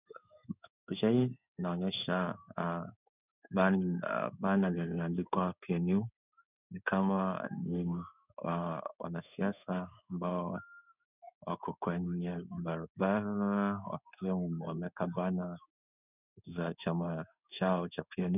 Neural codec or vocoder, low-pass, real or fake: codec, 16 kHz in and 24 kHz out, 1 kbps, XY-Tokenizer; 3.6 kHz; fake